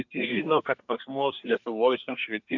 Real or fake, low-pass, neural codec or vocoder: fake; 7.2 kHz; codec, 24 kHz, 1 kbps, SNAC